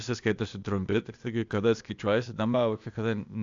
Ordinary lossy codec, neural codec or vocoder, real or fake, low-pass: AAC, 64 kbps; codec, 16 kHz, 0.8 kbps, ZipCodec; fake; 7.2 kHz